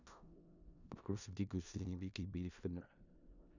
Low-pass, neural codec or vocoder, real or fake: 7.2 kHz; codec, 16 kHz in and 24 kHz out, 0.4 kbps, LongCat-Audio-Codec, four codebook decoder; fake